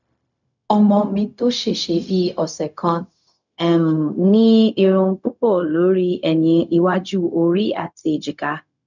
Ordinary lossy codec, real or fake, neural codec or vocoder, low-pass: none; fake; codec, 16 kHz, 0.4 kbps, LongCat-Audio-Codec; 7.2 kHz